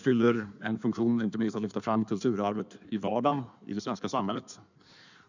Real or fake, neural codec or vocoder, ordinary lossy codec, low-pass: fake; codec, 16 kHz in and 24 kHz out, 1.1 kbps, FireRedTTS-2 codec; none; 7.2 kHz